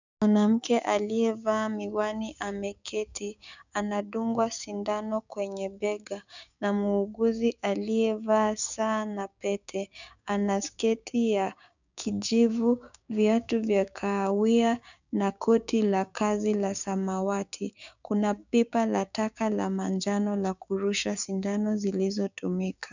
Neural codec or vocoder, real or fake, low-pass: codec, 16 kHz, 6 kbps, DAC; fake; 7.2 kHz